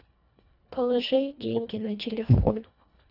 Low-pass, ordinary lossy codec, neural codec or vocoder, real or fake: 5.4 kHz; none; codec, 24 kHz, 1.5 kbps, HILCodec; fake